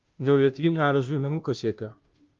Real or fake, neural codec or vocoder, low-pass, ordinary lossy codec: fake; codec, 16 kHz, 0.5 kbps, FunCodec, trained on Chinese and English, 25 frames a second; 7.2 kHz; Opus, 32 kbps